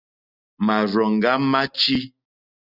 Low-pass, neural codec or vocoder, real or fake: 5.4 kHz; none; real